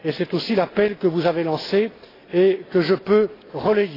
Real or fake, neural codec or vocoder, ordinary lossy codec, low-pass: real; none; AAC, 24 kbps; 5.4 kHz